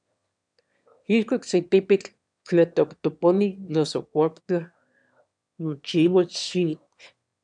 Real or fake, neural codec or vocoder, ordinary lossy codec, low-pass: fake; autoencoder, 22.05 kHz, a latent of 192 numbers a frame, VITS, trained on one speaker; MP3, 96 kbps; 9.9 kHz